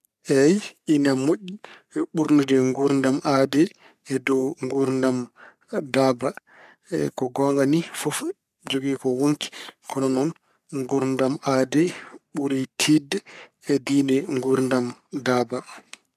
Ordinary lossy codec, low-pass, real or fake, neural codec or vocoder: none; 14.4 kHz; fake; codec, 44.1 kHz, 3.4 kbps, Pupu-Codec